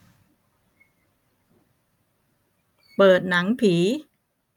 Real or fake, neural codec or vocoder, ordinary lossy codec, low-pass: fake; vocoder, 44.1 kHz, 128 mel bands every 256 samples, BigVGAN v2; none; 19.8 kHz